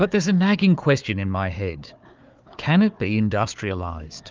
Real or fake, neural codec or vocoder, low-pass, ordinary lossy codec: fake; codec, 16 kHz, 4 kbps, FunCodec, trained on Chinese and English, 50 frames a second; 7.2 kHz; Opus, 24 kbps